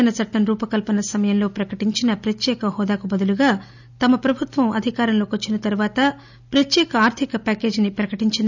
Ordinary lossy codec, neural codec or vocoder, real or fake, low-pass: none; none; real; 7.2 kHz